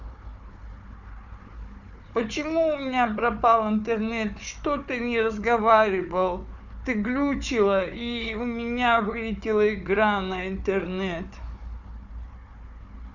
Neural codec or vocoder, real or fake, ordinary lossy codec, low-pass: codec, 16 kHz, 4 kbps, FunCodec, trained on Chinese and English, 50 frames a second; fake; none; 7.2 kHz